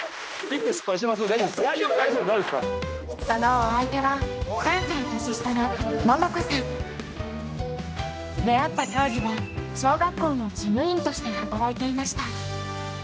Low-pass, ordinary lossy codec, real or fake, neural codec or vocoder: none; none; fake; codec, 16 kHz, 1 kbps, X-Codec, HuBERT features, trained on general audio